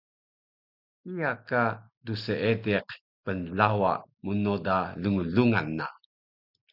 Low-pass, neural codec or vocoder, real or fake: 5.4 kHz; none; real